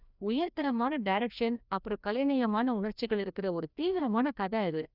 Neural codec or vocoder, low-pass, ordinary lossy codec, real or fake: codec, 16 kHz, 1 kbps, FreqCodec, larger model; 5.4 kHz; none; fake